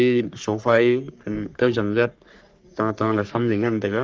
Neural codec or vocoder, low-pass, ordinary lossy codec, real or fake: codec, 44.1 kHz, 3.4 kbps, Pupu-Codec; 7.2 kHz; Opus, 24 kbps; fake